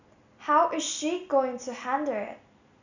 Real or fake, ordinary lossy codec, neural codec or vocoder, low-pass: real; none; none; 7.2 kHz